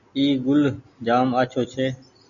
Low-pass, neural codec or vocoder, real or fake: 7.2 kHz; none; real